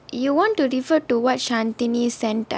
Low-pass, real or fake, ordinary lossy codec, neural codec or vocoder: none; real; none; none